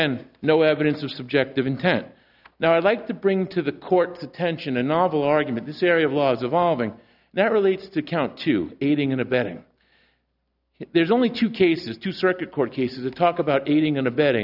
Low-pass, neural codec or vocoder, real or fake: 5.4 kHz; none; real